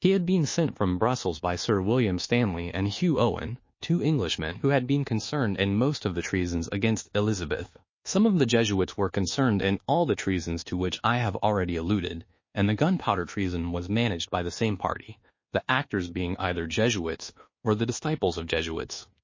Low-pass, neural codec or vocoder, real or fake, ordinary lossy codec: 7.2 kHz; codec, 24 kHz, 1.2 kbps, DualCodec; fake; MP3, 32 kbps